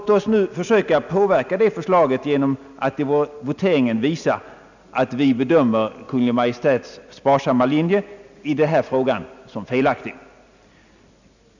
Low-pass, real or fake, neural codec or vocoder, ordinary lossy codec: 7.2 kHz; real; none; none